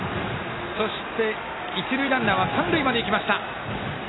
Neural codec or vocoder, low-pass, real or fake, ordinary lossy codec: none; 7.2 kHz; real; AAC, 16 kbps